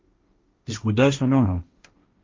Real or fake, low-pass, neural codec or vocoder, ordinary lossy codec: fake; 7.2 kHz; codec, 16 kHz, 1.1 kbps, Voila-Tokenizer; Opus, 32 kbps